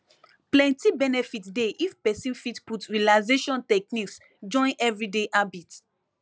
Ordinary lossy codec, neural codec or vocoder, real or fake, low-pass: none; none; real; none